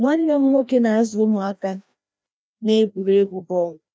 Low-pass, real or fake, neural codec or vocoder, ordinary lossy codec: none; fake; codec, 16 kHz, 1 kbps, FreqCodec, larger model; none